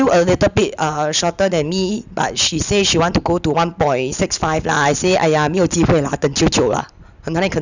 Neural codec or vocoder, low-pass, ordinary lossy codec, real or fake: none; 7.2 kHz; none; real